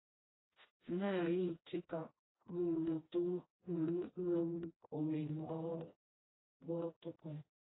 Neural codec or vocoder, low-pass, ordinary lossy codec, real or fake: codec, 16 kHz, 1 kbps, FreqCodec, smaller model; 7.2 kHz; AAC, 16 kbps; fake